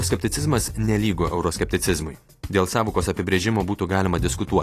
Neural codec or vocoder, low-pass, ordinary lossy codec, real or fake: vocoder, 44.1 kHz, 128 mel bands every 256 samples, BigVGAN v2; 14.4 kHz; AAC, 48 kbps; fake